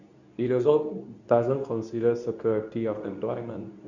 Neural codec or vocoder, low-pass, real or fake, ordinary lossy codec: codec, 24 kHz, 0.9 kbps, WavTokenizer, medium speech release version 2; 7.2 kHz; fake; none